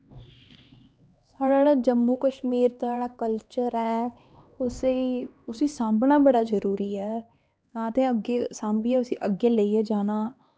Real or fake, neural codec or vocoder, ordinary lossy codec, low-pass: fake; codec, 16 kHz, 2 kbps, X-Codec, HuBERT features, trained on LibriSpeech; none; none